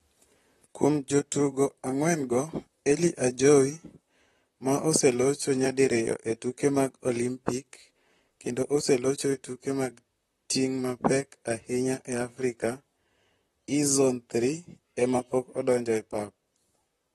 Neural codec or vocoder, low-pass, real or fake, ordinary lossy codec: vocoder, 44.1 kHz, 128 mel bands, Pupu-Vocoder; 19.8 kHz; fake; AAC, 32 kbps